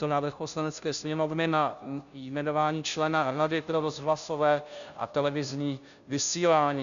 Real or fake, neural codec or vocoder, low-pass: fake; codec, 16 kHz, 0.5 kbps, FunCodec, trained on Chinese and English, 25 frames a second; 7.2 kHz